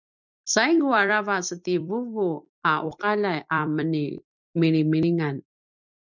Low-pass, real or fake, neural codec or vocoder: 7.2 kHz; fake; vocoder, 44.1 kHz, 128 mel bands every 256 samples, BigVGAN v2